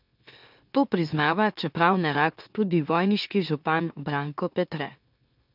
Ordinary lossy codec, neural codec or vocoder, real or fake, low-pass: none; autoencoder, 44.1 kHz, a latent of 192 numbers a frame, MeloTTS; fake; 5.4 kHz